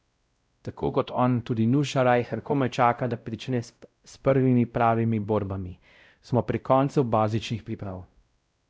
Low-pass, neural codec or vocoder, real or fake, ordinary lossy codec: none; codec, 16 kHz, 0.5 kbps, X-Codec, WavLM features, trained on Multilingual LibriSpeech; fake; none